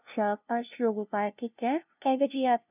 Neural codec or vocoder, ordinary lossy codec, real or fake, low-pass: codec, 16 kHz, 0.5 kbps, FunCodec, trained on LibriTTS, 25 frames a second; none; fake; 3.6 kHz